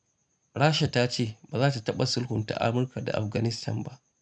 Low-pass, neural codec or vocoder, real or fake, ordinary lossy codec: 9.9 kHz; none; real; none